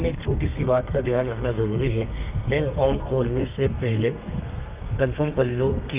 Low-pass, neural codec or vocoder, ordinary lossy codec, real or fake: 3.6 kHz; codec, 32 kHz, 1.9 kbps, SNAC; Opus, 24 kbps; fake